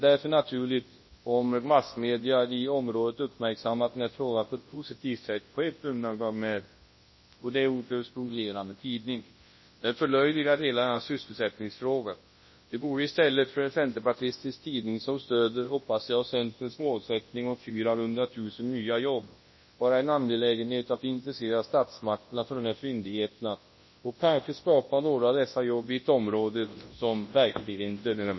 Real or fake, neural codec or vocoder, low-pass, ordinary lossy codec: fake; codec, 24 kHz, 0.9 kbps, WavTokenizer, large speech release; 7.2 kHz; MP3, 24 kbps